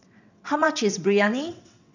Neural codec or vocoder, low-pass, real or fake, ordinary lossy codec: vocoder, 22.05 kHz, 80 mel bands, WaveNeXt; 7.2 kHz; fake; none